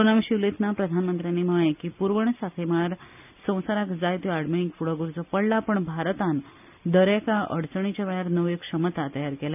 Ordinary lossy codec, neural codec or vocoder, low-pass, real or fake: none; none; 3.6 kHz; real